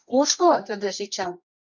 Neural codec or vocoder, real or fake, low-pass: codec, 24 kHz, 0.9 kbps, WavTokenizer, medium music audio release; fake; 7.2 kHz